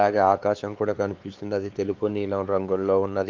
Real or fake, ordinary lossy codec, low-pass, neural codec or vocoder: fake; Opus, 24 kbps; 7.2 kHz; codec, 16 kHz, 4 kbps, X-Codec, WavLM features, trained on Multilingual LibriSpeech